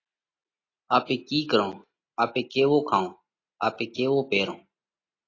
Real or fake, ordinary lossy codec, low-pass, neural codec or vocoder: real; AAC, 32 kbps; 7.2 kHz; none